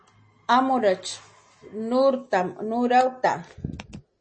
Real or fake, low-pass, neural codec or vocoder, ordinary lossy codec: real; 9.9 kHz; none; MP3, 32 kbps